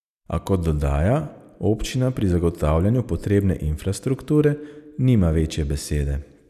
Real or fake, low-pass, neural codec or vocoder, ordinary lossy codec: real; 14.4 kHz; none; none